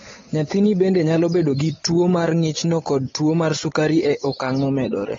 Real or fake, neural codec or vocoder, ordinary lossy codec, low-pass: fake; codec, 16 kHz, 8 kbps, FunCodec, trained on Chinese and English, 25 frames a second; AAC, 24 kbps; 7.2 kHz